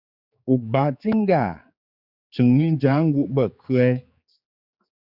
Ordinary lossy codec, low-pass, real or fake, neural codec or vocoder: Opus, 64 kbps; 5.4 kHz; fake; codec, 16 kHz, 4 kbps, X-Codec, HuBERT features, trained on general audio